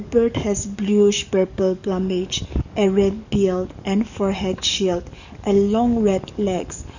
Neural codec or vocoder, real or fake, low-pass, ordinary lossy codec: codec, 44.1 kHz, 7.8 kbps, DAC; fake; 7.2 kHz; none